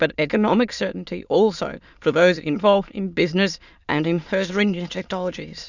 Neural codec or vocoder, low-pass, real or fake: autoencoder, 22.05 kHz, a latent of 192 numbers a frame, VITS, trained on many speakers; 7.2 kHz; fake